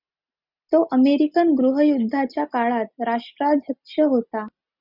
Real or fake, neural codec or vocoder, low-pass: real; none; 5.4 kHz